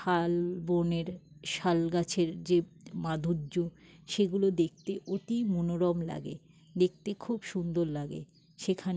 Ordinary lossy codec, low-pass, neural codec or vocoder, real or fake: none; none; none; real